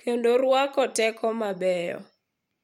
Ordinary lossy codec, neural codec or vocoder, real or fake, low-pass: MP3, 64 kbps; vocoder, 44.1 kHz, 128 mel bands every 512 samples, BigVGAN v2; fake; 19.8 kHz